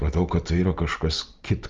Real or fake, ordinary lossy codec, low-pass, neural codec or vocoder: real; Opus, 32 kbps; 7.2 kHz; none